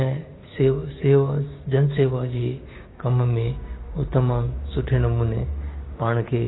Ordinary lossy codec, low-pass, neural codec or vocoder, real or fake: AAC, 16 kbps; 7.2 kHz; codec, 16 kHz, 6 kbps, DAC; fake